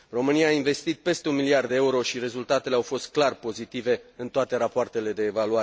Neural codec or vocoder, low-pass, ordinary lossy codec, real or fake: none; none; none; real